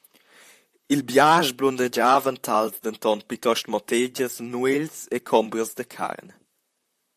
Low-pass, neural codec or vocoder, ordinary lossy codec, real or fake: 14.4 kHz; vocoder, 44.1 kHz, 128 mel bands, Pupu-Vocoder; AAC, 96 kbps; fake